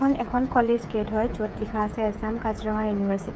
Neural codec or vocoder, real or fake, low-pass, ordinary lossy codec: codec, 16 kHz, 8 kbps, FreqCodec, smaller model; fake; none; none